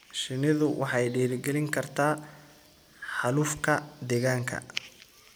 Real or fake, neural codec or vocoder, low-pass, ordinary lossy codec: real; none; none; none